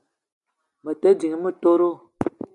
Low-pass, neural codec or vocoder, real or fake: 10.8 kHz; none; real